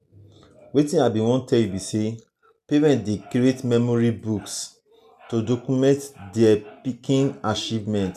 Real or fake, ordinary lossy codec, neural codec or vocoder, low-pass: real; none; none; 14.4 kHz